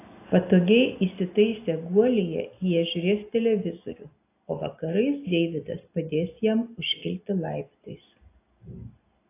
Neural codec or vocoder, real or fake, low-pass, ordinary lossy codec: none; real; 3.6 kHz; AAC, 24 kbps